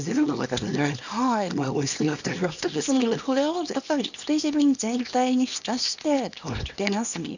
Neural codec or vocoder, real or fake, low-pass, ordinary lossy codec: codec, 24 kHz, 0.9 kbps, WavTokenizer, small release; fake; 7.2 kHz; none